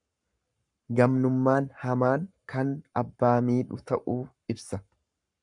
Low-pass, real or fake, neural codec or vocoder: 10.8 kHz; fake; codec, 44.1 kHz, 7.8 kbps, Pupu-Codec